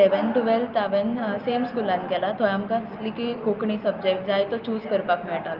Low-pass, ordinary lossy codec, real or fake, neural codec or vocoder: 5.4 kHz; Opus, 32 kbps; real; none